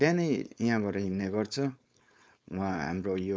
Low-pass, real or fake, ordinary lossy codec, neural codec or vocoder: none; fake; none; codec, 16 kHz, 4.8 kbps, FACodec